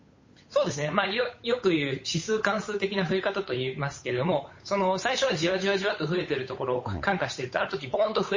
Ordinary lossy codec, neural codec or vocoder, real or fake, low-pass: MP3, 32 kbps; codec, 16 kHz, 8 kbps, FunCodec, trained on Chinese and English, 25 frames a second; fake; 7.2 kHz